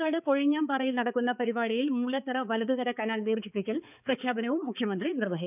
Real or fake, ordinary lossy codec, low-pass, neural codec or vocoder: fake; none; 3.6 kHz; codec, 16 kHz, 4 kbps, X-Codec, HuBERT features, trained on balanced general audio